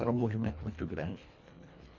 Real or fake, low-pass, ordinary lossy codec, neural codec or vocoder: fake; 7.2 kHz; MP3, 64 kbps; codec, 24 kHz, 1.5 kbps, HILCodec